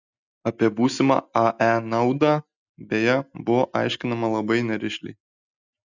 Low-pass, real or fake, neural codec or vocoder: 7.2 kHz; real; none